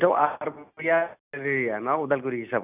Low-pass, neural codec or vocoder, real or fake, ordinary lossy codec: 3.6 kHz; none; real; none